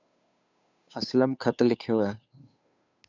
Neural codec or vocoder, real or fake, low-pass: codec, 16 kHz, 2 kbps, FunCodec, trained on Chinese and English, 25 frames a second; fake; 7.2 kHz